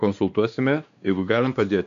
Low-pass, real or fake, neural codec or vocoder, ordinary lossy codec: 7.2 kHz; fake; codec, 16 kHz, 2 kbps, X-Codec, WavLM features, trained on Multilingual LibriSpeech; MP3, 64 kbps